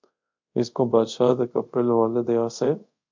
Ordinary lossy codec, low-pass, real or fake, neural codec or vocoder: MP3, 64 kbps; 7.2 kHz; fake; codec, 24 kHz, 0.5 kbps, DualCodec